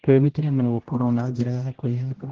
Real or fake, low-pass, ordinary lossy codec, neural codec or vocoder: fake; 7.2 kHz; Opus, 16 kbps; codec, 16 kHz, 0.5 kbps, X-Codec, HuBERT features, trained on general audio